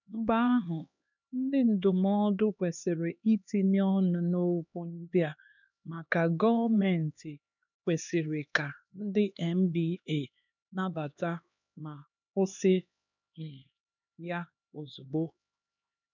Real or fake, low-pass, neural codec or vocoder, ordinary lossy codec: fake; 7.2 kHz; codec, 16 kHz, 2 kbps, X-Codec, HuBERT features, trained on LibriSpeech; none